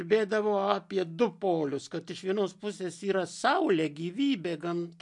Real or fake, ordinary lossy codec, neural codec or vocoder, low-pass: real; MP3, 64 kbps; none; 10.8 kHz